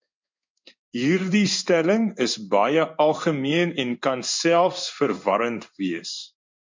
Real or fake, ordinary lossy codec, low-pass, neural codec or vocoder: fake; MP3, 64 kbps; 7.2 kHz; codec, 16 kHz in and 24 kHz out, 1 kbps, XY-Tokenizer